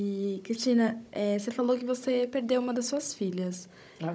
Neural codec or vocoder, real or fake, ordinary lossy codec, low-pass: codec, 16 kHz, 16 kbps, FunCodec, trained on Chinese and English, 50 frames a second; fake; none; none